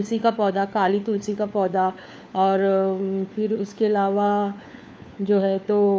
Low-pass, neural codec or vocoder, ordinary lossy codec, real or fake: none; codec, 16 kHz, 4 kbps, FunCodec, trained on LibriTTS, 50 frames a second; none; fake